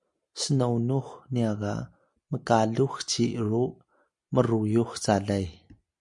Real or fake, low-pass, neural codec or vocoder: real; 10.8 kHz; none